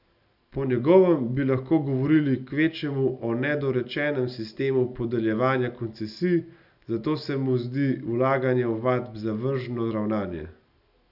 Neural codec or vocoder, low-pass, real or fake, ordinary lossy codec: none; 5.4 kHz; real; none